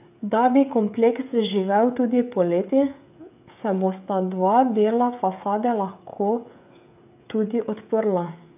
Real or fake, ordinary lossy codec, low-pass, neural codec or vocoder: fake; AAC, 32 kbps; 3.6 kHz; codec, 16 kHz, 16 kbps, FreqCodec, smaller model